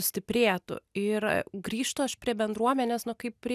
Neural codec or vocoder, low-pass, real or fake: none; 14.4 kHz; real